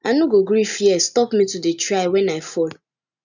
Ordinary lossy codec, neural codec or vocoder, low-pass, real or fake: none; none; 7.2 kHz; real